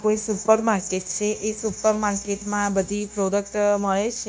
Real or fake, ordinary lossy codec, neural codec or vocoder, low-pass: fake; none; codec, 16 kHz, 0.9 kbps, LongCat-Audio-Codec; none